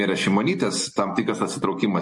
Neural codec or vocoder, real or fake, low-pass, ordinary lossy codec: none; real; 10.8 kHz; MP3, 48 kbps